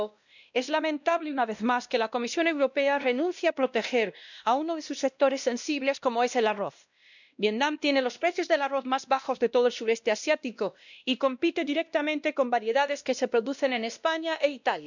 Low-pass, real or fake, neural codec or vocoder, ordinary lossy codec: 7.2 kHz; fake; codec, 16 kHz, 1 kbps, X-Codec, WavLM features, trained on Multilingual LibriSpeech; none